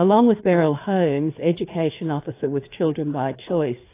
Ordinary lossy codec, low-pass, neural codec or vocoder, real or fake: AAC, 24 kbps; 3.6 kHz; codec, 16 kHz, 4 kbps, FreqCodec, larger model; fake